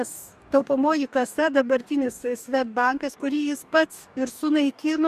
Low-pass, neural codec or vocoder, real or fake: 14.4 kHz; codec, 44.1 kHz, 2.6 kbps, DAC; fake